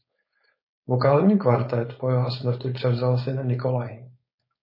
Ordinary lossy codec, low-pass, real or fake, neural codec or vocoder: MP3, 24 kbps; 5.4 kHz; fake; codec, 16 kHz, 4.8 kbps, FACodec